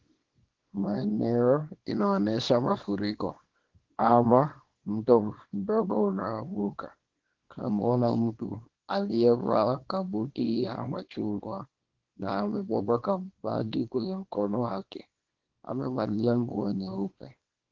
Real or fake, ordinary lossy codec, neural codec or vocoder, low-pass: fake; Opus, 16 kbps; codec, 24 kHz, 0.9 kbps, WavTokenizer, small release; 7.2 kHz